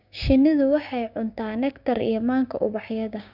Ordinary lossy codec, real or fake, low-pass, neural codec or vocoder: none; fake; 5.4 kHz; codec, 16 kHz, 6 kbps, DAC